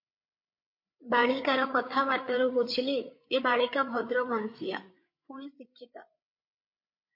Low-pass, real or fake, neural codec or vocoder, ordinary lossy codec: 5.4 kHz; fake; codec, 16 kHz, 8 kbps, FreqCodec, larger model; MP3, 32 kbps